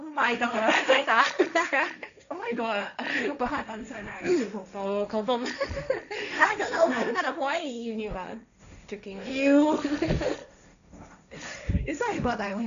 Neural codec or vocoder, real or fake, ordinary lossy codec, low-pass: codec, 16 kHz, 1.1 kbps, Voila-Tokenizer; fake; none; 7.2 kHz